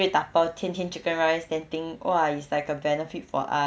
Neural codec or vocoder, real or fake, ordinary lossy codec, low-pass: none; real; none; none